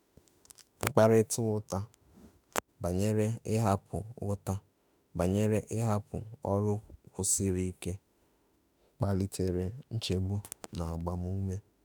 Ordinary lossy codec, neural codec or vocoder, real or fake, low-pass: none; autoencoder, 48 kHz, 32 numbers a frame, DAC-VAE, trained on Japanese speech; fake; none